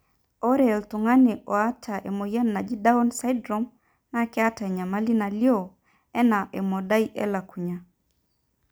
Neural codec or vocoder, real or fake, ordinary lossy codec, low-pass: none; real; none; none